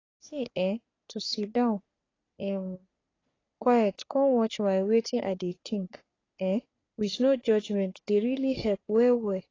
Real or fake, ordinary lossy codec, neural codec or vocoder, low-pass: fake; AAC, 32 kbps; codec, 44.1 kHz, 3.4 kbps, Pupu-Codec; 7.2 kHz